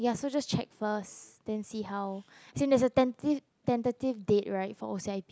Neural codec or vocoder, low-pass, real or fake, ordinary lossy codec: none; none; real; none